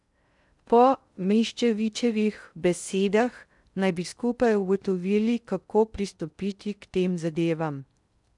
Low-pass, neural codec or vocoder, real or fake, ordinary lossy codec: 10.8 kHz; codec, 16 kHz in and 24 kHz out, 0.6 kbps, FocalCodec, streaming, 2048 codes; fake; none